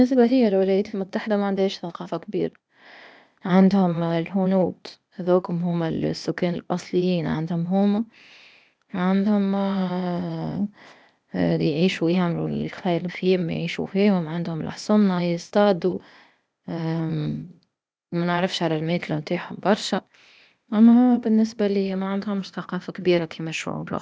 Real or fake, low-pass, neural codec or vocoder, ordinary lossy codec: fake; none; codec, 16 kHz, 0.8 kbps, ZipCodec; none